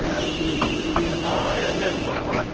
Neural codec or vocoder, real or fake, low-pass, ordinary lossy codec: codec, 24 kHz, 0.9 kbps, WavTokenizer, medium speech release version 1; fake; 7.2 kHz; Opus, 16 kbps